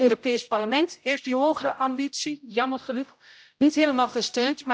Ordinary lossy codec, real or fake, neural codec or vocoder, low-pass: none; fake; codec, 16 kHz, 0.5 kbps, X-Codec, HuBERT features, trained on general audio; none